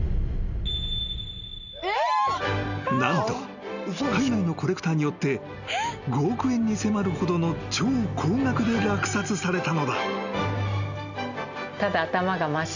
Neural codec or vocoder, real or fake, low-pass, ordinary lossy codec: none; real; 7.2 kHz; none